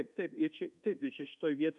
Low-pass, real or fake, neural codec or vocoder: 10.8 kHz; fake; codec, 24 kHz, 1.2 kbps, DualCodec